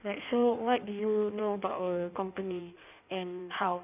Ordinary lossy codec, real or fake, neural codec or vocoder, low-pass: none; fake; codec, 16 kHz in and 24 kHz out, 1.1 kbps, FireRedTTS-2 codec; 3.6 kHz